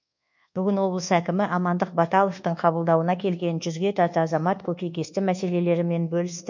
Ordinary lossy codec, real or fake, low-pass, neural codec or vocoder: none; fake; 7.2 kHz; codec, 24 kHz, 1.2 kbps, DualCodec